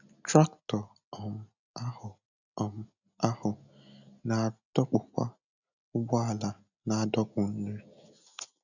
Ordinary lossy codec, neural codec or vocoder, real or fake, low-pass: none; none; real; 7.2 kHz